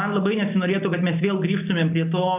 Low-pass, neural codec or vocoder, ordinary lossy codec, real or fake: 3.6 kHz; none; AAC, 32 kbps; real